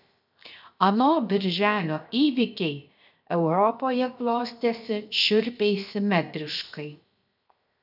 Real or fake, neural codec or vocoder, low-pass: fake; codec, 16 kHz, 0.7 kbps, FocalCodec; 5.4 kHz